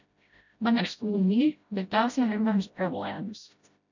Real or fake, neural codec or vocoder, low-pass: fake; codec, 16 kHz, 0.5 kbps, FreqCodec, smaller model; 7.2 kHz